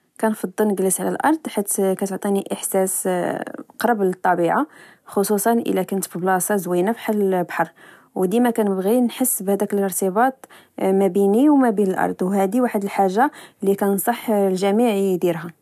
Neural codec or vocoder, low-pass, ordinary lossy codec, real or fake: none; 14.4 kHz; none; real